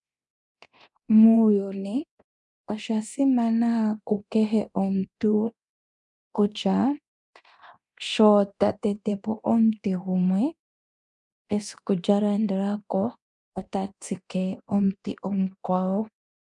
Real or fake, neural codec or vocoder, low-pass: fake; codec, 24 kHz, 0.9 kbps, DualCodec; 10.8 kHz